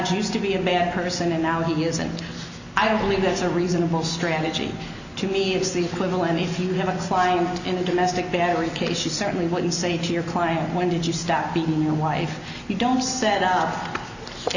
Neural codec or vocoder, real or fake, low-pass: none; real; 7.2 kHz